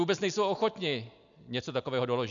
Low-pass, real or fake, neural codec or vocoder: 7.2 kHz; real; none